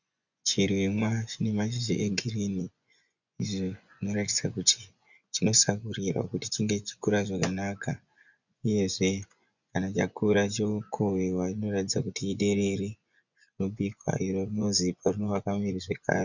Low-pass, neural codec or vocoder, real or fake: 7.2 kHz; vocoder, 24 kHz, 100 mel bands, Vocos; fake